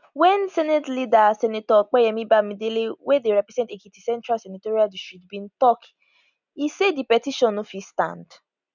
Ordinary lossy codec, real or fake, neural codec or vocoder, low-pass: none; real; none; 7.2 kHz